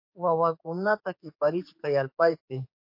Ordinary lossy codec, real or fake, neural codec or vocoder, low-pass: MP3, 32 kbps; fake; codec, 16 kHz, 4 kbps, X-Codec, HuBERT features, trained on general audio; 5.4 kHz